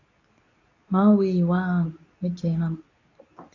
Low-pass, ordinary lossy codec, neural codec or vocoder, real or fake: 7.2 kHz; AAC, 32 kbps; codec, 24 kHz, 0.9 kbps, WavTokenizer, medium speech release version 2; fake